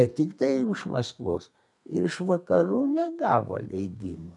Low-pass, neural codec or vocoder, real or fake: 10.8 kHz; codec, 32 kHz, 1.9 kbps, SNAC; fake